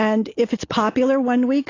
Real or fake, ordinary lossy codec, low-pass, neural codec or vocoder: real; AAC, 32 kbps; 7.2 kHz; none